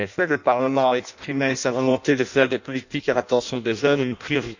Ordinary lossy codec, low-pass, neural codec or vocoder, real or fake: none; 7.2 kHz; codec, 16 kHz in and 24 kHz out, 0.6 kbps, FireRedTTS-2 codec; fake